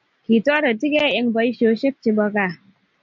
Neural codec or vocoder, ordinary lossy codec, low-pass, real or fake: none; MP3, 64 kbps; 7.2 kHz; real